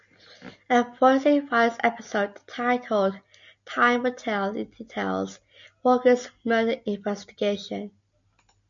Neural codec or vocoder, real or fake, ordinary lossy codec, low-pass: none; real; MP3, 64 kbps; 7.2 kHz